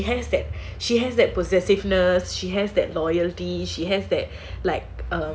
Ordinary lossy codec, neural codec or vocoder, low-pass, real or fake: none; none; none; real